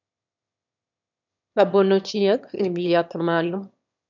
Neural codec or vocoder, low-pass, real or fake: autoencoder, 22.05 kHz, a latent of 192 numbers a frame, VITS, trained on one speaker; 7.2 kHz; fake